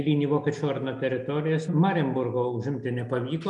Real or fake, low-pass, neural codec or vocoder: real; 10.8 kHz; none